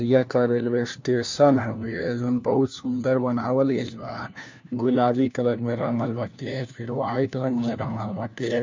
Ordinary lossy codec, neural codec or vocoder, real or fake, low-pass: MP3, 64 kbps; codec, 16 kHz, 1 kbps, FunCodec, trained on LibriTTS, 50 frames a second; fake; 7.2 kHz